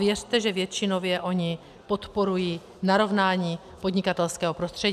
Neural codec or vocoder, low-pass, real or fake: none; 14.4 kHz; real